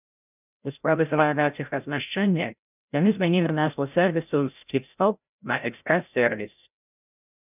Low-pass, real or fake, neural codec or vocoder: 3.6 kHz; fake; codec, 16 kHz, 0.5 kbps, FreqCodec, larger model